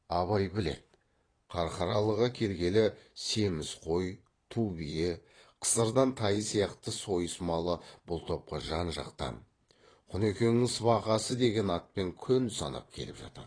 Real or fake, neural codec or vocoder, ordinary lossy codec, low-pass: fake; vocoder, 22.05 kHz, 80 mel bands, Vocos; AAC, 32 kbps; 9.9 kHz